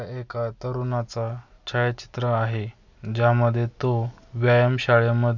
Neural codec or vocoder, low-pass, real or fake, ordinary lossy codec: none; 7.2 kHz; real; none